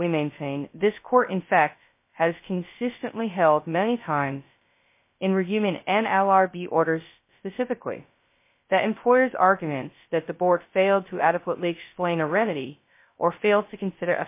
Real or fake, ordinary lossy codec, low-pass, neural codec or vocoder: fake; MP3, 24 kbps; 3.6 kHz; codec, 16 kHz, 0.2 kbps, FocalCodec